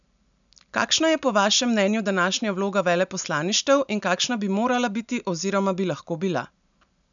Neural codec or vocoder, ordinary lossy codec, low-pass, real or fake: none; none; 7.2 kHz; real